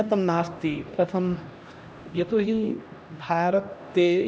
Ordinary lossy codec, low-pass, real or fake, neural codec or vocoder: none; none; fake; codec, 16 kHz, 1 kbps, X-Codec, HuBERT features, trained on LibriSpeech